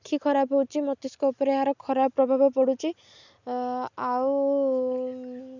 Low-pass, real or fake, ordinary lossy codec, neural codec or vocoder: 7.2 kHz; real; none; none